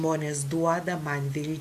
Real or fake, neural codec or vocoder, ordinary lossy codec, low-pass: real; none; AAC, 96 kbps; 14.4 kHz